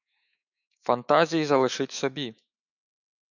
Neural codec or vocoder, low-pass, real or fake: autoencoder, 48 kHz, 128 numbers a frame, DAC-VAE, trained on Japanese speech; 7.2 kHz; fake